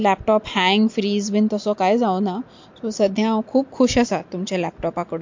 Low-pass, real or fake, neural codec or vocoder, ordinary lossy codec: 7.2 kHz; real; none; MP3, 48 kbps